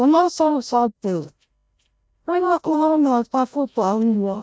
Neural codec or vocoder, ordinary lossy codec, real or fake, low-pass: codec, 16 kHz, 0.5 kbps, FreqCodec, larger model; none; fake; none